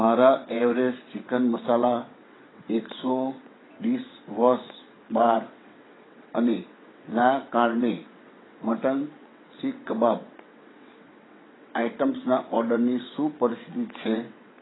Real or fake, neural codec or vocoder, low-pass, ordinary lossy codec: fake; vocoder, 22.05 kHz, 80 mel bands, WaveNeXt; 7.2 kHz; AAC, 16 kbps